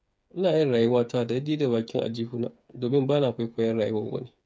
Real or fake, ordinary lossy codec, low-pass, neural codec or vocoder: fake; none; none; codec, 16 kHz, 8 kbps, FreqCodec, smaller model